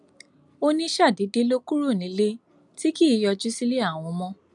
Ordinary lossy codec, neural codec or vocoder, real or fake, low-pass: none; none; real; 10.8 kHz